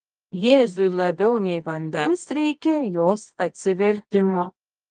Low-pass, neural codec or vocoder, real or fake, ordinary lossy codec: 10.8 kHz; codec, 24 kHz, 0.9 kbps, WavTokenizer, medium music audio release; fake; Opus, 32 kbps